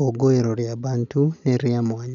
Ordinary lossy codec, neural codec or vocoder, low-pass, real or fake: MP3, 96 kbps; none; 7.2 kHz; real